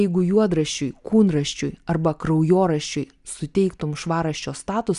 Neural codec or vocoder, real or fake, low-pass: none; real; 10.8 kHz